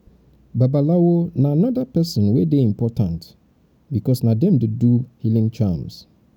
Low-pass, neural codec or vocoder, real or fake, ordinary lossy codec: 19.8 kHz; none; real; none